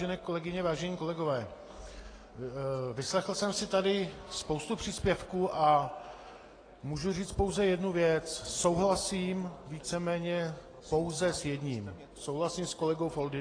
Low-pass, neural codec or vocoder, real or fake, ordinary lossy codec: 9.9 kHz; none; real; AAC, 32 kbps